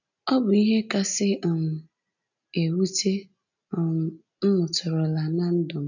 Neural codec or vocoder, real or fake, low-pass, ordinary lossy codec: none; real; 7.2 kHz; none